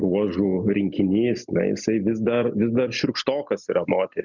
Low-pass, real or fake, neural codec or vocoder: 7.2 kHz; real; none